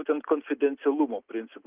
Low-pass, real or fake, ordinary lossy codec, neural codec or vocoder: 3.6 kHz; real; Opus, 32 kbps; none